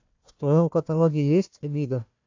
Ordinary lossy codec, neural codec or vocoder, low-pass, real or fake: AAC, 48 kbps; codec, 16 kHz, 1 kbps, FunCodec, trained on Chinese and English, 50 frames a second; 7.2 kHz; fake